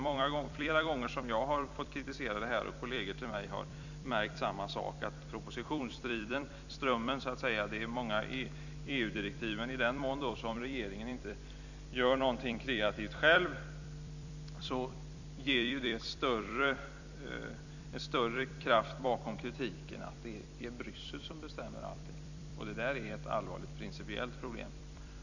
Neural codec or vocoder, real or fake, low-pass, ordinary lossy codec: vocoder, 44.1 kHz, 128 mel bands every 256 samples, BigVGAN v2; fake; 7.2 kHz; none